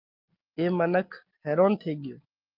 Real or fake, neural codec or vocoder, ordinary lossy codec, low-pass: real; none; Opus, 32 kbps; 5.4 kHz